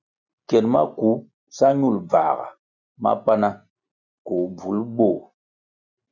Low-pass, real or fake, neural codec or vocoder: 7.2 kHz; real; none